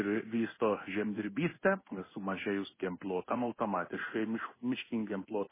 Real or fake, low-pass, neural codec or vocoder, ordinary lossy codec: fake; 3.6 kHz; codec, 16 kHz in and 24 kHz out, 1 kbps, XY-Tokenizer; MP3, 16 kbps